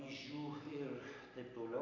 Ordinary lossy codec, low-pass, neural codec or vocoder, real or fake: AAC, 32 kbps; 7.2 kHz; none; real